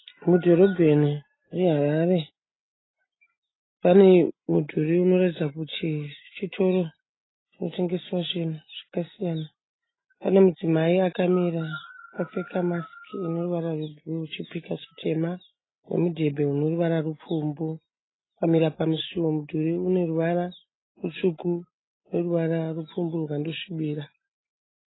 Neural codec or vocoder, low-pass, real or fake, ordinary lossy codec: none; 7.2 kHz; real; AAC, 16 kbps